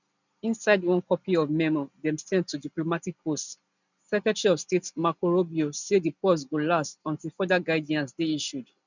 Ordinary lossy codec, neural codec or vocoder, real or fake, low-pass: none; none; real; 7.2 kHz